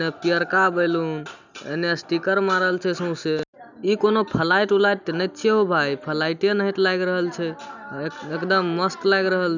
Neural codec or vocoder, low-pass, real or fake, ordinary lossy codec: none; 7.2 kHz; real; none